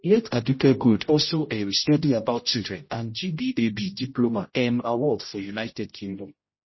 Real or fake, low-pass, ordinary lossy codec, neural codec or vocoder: fake; 7.2 kHz; MP3, 24 kbps; codec, 16 kHz, 0.5 kbps, X-Codec, HuBERT features, trained on general audio